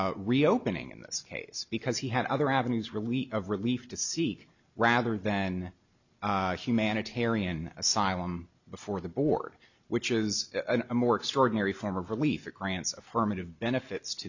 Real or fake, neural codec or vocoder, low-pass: real; none; 7.2 kHz